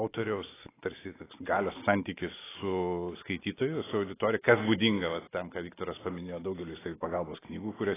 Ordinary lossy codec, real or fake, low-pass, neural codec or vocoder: AAC, 16 kbps; real; 3.6 kHz; none